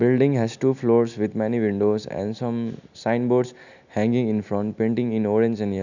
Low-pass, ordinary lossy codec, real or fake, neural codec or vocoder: 7.2 kHz; none; real; none